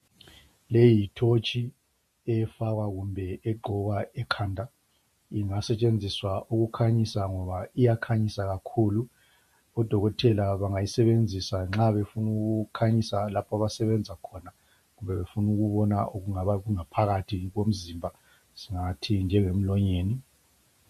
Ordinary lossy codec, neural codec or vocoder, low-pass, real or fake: MP3, 64 kbps; vocoder, 48 kHz, 128 mel bands, Vocos; 14.4 kHz; fake